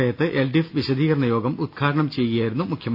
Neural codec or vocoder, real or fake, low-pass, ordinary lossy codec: none; real; 5.4 kHz; none